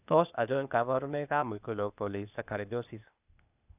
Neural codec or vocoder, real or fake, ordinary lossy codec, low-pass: codec, 16 kHz, 0.8 kbps, ZipCodec; fake; none; 3.6 kHz